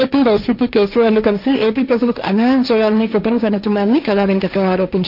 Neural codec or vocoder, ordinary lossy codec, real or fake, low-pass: codec, 16 kHz, 1.1 kbps, Voila-Tokenizer; none; fake; 5.4 kHz